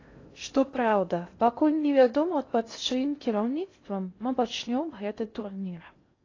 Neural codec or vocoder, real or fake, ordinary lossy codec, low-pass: codec, 16 kHz in and 24 kHz out, 0.6 kbps, FocalCodec, streaming, 2048 codes; fake; AAC, 32 kbps; 7.2 kHz